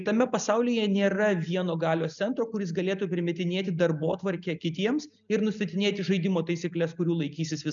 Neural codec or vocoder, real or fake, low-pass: none; real; 7.2 kHz